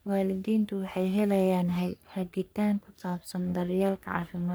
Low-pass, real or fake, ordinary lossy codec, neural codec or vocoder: none; fake; none; codec, 44.1 kHz, 3.4 kbps, Pupu-Codec